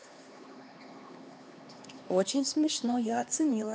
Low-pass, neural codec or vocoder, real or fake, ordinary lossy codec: none; codec, 16 kHz, 2 kbps, X-Codec, HuBERT features, trained on LibriSpeech; fake; none